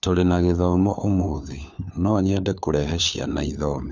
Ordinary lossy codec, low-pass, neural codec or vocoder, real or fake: none; none; codec, 16 kHz, 4 kbps, FunCodec, trained on LibriTTS, 50 frames a second; fake